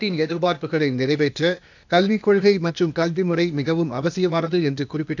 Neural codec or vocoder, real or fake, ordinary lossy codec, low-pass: codec, 16 kHz, 0.8 kbps, ZipCodec; fake; none; 7.2 kHz